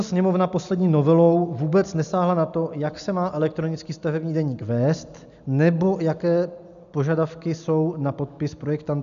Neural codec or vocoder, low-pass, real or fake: none; 7.2 kHz; real